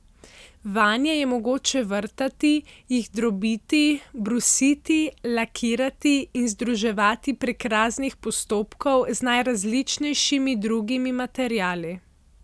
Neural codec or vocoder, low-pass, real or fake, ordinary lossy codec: none; none; real; none